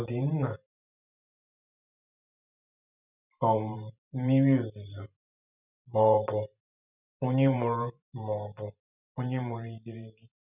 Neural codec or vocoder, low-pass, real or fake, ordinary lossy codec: vocoder, 44.1 kHz, 128 mel bands every 512 samples, BigVGAN v2; 3.6 kHz; fake; none